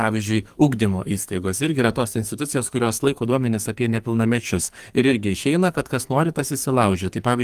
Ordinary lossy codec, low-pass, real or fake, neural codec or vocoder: Opus, 32 kbps; 14.4 kHz; fake; codec, 44.1 kHz, 2.6 kbps, SNAC